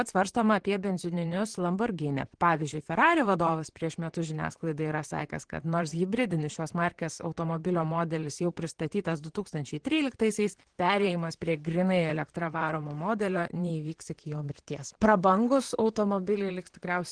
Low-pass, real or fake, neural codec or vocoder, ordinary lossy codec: 9.9 kHz; fake; vocoder, 44.1 kHz, 128 mel bands, Pupu-Vocoder; Opus, 16 kbps